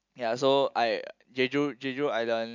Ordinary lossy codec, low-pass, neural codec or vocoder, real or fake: MP3, 64 kbps; 7.2 kHz; none; real